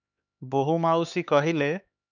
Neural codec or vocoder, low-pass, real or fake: codec, 16 kHz, 4 kbps, X-Codec, HuBERT features, trained on LibriSpeech; 7.2 kHz; fake